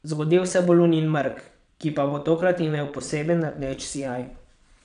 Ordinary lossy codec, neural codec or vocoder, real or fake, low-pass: none; vocoder, 22.05 kHz, 80 mel bands, Vocos; fake; 9.9 kHz